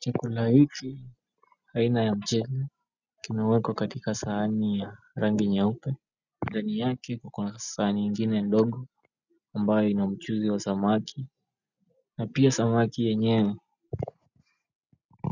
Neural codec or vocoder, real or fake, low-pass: none; real; 7.2 kHz